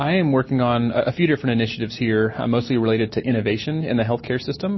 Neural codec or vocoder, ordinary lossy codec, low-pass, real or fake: none; MP3, 24 kbps; 7.2 kHz; real